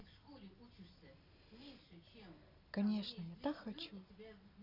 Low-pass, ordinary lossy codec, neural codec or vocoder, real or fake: 5.4 kHz; Opus, 64 kbps; none; real